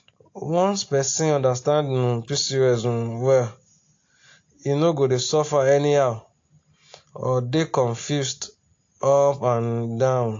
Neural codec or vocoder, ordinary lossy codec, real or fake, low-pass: none; AAC, 48 kbps; real; 7.2 kHz